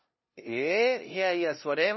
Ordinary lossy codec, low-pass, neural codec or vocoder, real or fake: MP3, 24 kbps; 7.2 kHz; codec, 16 kHz, 0.5 kbps, FunCodec, trained on LibriTTS, 25 frames a second; fake